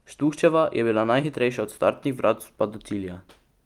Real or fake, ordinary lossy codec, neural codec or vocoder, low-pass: real; Opus, 32 kbps; none; 19.8 kHz